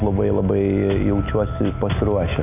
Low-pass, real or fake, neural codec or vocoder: 3.6 kHz; real; none